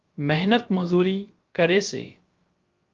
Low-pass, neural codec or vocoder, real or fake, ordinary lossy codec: 7.2 kHz; codec, 16 kHz, about 1 kbps, DyCAST, with the encoder's durations; fake; Opus, 32 kbps